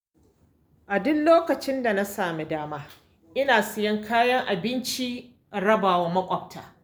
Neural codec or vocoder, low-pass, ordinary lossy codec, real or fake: none; none; none; real